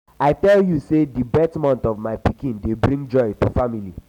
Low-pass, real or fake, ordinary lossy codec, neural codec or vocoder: 19.8 kHz; real; none; none